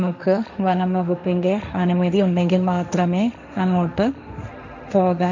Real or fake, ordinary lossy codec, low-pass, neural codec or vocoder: fake; none; 7.2 kHz; codec, 16 kHz, 1.1 kbps, Voila-Tokenizer